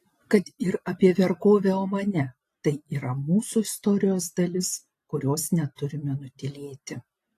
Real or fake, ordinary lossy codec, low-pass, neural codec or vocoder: real; AAC, 48 kbps; 14.4 kHz; none